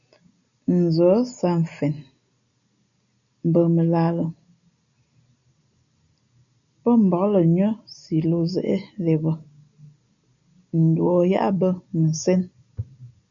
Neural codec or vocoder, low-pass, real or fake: none; 7.2 kHz; real